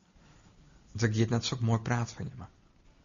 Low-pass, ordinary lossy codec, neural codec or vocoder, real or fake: 7.2 kHz; MP3, 96 kbps; none; real